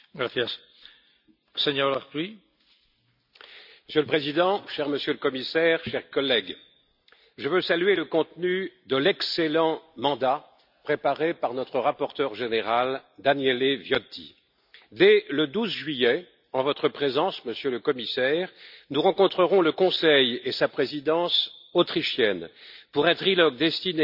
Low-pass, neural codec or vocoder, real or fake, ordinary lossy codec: 5.4 kHz; none; real; none